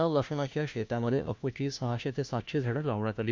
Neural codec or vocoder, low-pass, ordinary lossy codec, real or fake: codec, 16 kHz, 1 kbps, FunCodec, trained on LibriTTS, 50 frames a second; none; none; fake